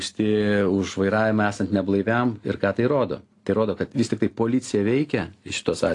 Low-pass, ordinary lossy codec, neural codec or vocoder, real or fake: 10.8 kHz; AAC, 48 kbps; none; real